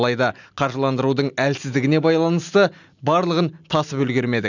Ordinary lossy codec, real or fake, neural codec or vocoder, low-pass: none; real; none; 7.2 kHz